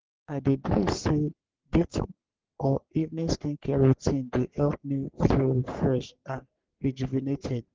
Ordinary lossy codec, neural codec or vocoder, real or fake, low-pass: Opus, 16 kbps; codec, 44.1 kHz, 3.4 kbps, Pupu-Codec; fake; 7.2 kHz